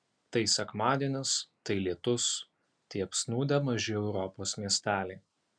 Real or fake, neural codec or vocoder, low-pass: real; none; 9.9 kHz